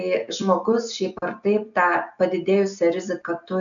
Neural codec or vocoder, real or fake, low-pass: none; real; 7.2 kHz